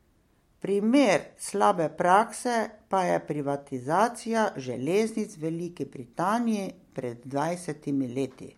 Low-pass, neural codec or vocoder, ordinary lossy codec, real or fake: 19.8 kHz; none; MP3, 64 kbps; real